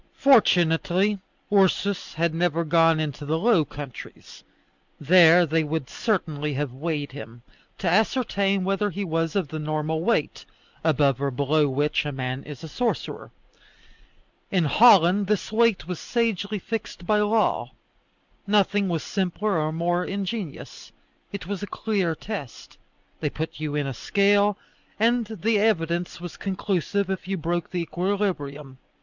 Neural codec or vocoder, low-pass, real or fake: none; 7.2 kHz; real